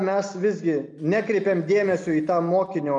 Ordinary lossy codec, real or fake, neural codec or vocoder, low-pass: MP3, 96 kbps; real; none; 10.8 kHz